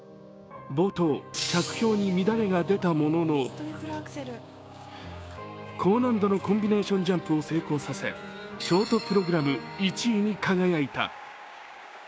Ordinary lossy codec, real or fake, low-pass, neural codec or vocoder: none; fake; none; codec, 16 kHz, 6 kbps, DAC